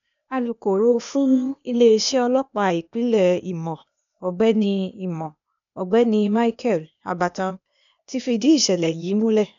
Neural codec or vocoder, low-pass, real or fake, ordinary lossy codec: codec, 16 kHz, 0.8 kbps, ZipCodec; 7.2 kHz; fake; none